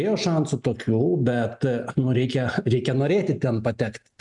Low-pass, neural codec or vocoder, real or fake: 10.8 kHz; none; real